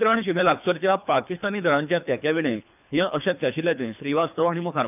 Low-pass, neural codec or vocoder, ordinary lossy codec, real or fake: 3.6 kHz; codec, 24 kHz, 3 kbps, HILCodec; none; fake